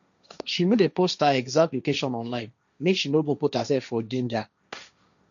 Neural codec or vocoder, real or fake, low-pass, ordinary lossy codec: codec, 16 kHz, 1.1 kbps, Voila-Tokenizer; fake; 7.2 kHz; none